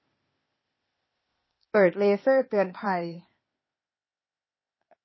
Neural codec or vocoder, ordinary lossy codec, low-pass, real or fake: codec, 16 kHz, 0.8 kbps, ZipCodec; MP3, 24 kbps; 7.2 kHz; fake